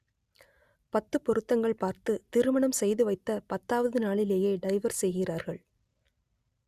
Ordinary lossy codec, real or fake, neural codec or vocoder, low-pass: Opus, 64 kbps; real; none; 14.4 kHz